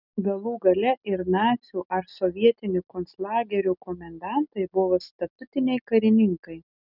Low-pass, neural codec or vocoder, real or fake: 5.4 kHz; none; real